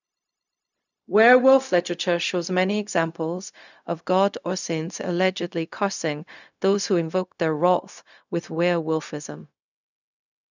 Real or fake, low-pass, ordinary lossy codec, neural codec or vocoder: fake; 7.2 kHz; none; codec, 16 kHz, 0.4 kbps, LongCat-Audio-Codec